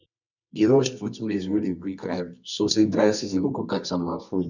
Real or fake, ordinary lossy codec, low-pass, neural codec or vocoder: fake; none; 7.2 kHz; codec, 24 kHz, 0.9 kbps, WavTokenizer, medium music audio release